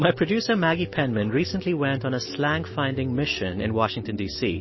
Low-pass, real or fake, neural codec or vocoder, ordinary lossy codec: 7.2 kHz; real; none; MP3, 24 kbps